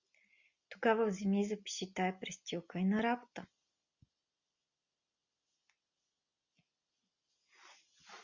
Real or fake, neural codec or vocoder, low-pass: real; none; 7.2 kHz